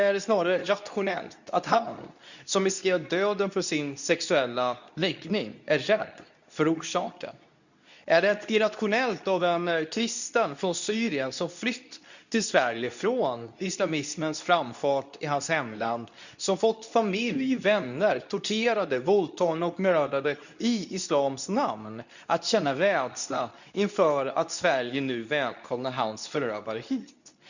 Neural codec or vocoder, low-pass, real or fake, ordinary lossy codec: codec, 24 kHz, 0.9 kbps, WavTokenizer, medium speech release version 2; 7.2 kHz; fake; none